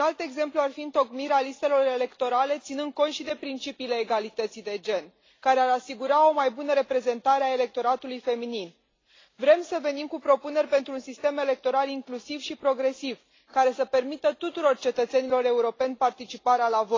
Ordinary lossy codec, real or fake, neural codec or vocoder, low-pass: AAC, 32 kbps; real; none; 7.2 kHz